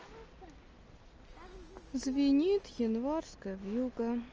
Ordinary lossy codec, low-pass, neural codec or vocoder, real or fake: Opus, 24 kbps; 7.2 kHz; none; real